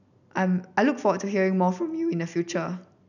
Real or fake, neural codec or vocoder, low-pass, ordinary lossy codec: real; none; 7.2 kHz; none